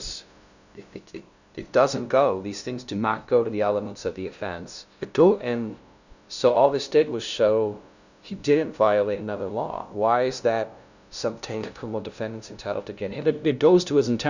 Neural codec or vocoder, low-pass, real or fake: codec, 16 kHz, 0.5 kbps, FunCodec, trained on LibriTTS, 25 frames a second; 7.2 kHz; fake